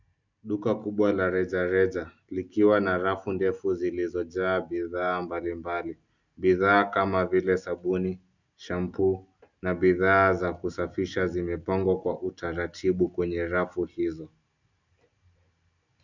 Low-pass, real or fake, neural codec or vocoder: 7.2 kHz; real; none